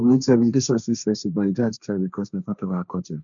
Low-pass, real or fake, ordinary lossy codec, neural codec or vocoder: 7.2 kHz; fake; none; codec, 16 kHz, 1.1 kbps, Voila-Tokenizer